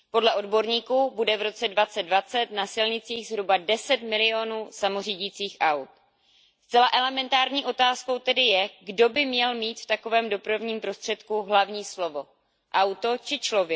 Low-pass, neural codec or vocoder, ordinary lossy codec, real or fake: none; none; none; real